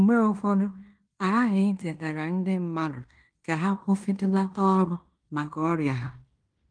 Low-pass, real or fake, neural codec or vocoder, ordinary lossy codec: 9.9 kHz; fake; codec, 16 kHz in and 24 kHz out, 0.9 kbps, LongCat-Audio-Codec, fine tuned four codebook decoder; Opus, 32 kbps